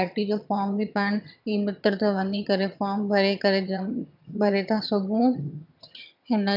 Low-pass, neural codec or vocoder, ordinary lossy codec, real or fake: 5.4 kHz; vocoder, 22.05 kHz, 80 mel bands, HiFi-GAN; none; fake